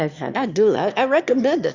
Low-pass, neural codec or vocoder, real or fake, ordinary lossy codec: 7.2 kHz; autoencoder, 22.05 kHz, a latent of 192 numbers a frame, VITS, trained on one speaker; fake; Opus, 64 kbps